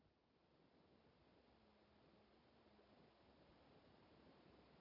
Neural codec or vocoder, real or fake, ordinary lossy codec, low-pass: none; real; none; 5.4 kHz